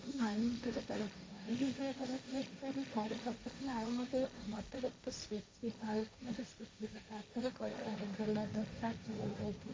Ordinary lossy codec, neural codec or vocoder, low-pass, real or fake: none; codec, 16 kHz, 1.1 kbps, Voila-Tokenizer; none; fake